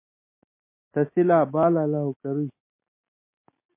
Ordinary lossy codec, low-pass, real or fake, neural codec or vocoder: MP3, 24 kbps; 3.6 kHz; real; none